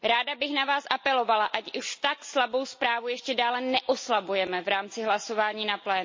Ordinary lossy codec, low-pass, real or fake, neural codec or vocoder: MP3, 32 kbps; 7.2 kHz; real; none